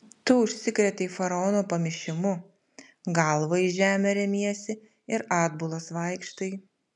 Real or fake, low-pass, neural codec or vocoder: real; 10.8 kHz; none